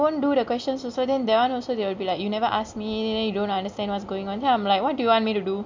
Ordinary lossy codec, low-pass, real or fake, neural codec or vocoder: MP3, 64 kbps; 7.2 kHz; real; none